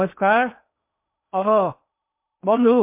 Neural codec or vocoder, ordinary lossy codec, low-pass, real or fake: codec, 16 kHz in and 24 kHz out, 0.8 kbps, FocalCodec, streaming, 65536 codes; MP3, 32 kbps; 3.6 kHz; fake